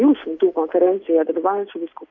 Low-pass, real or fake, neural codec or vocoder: 7.2 kHz; real; none